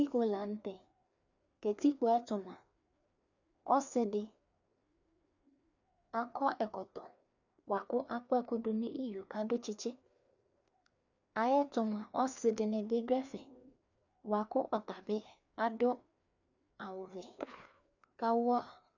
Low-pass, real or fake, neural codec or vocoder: 7.2 kHz; fake; codec, 16 kHz, 2 kbps, FreqCodec, larger model